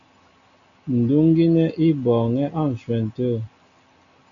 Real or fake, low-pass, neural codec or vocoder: real; 7.2 kHz; none